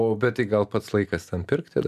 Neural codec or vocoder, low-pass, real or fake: none; 14.4 kHz; real